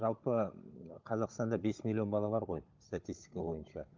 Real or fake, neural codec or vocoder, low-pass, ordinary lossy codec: fake; codec, 16 kHz, 16 kbps, FunCodec, trained on Chinese and English, 50 frames a second; 7.2 kHz; Opus, 24 kbps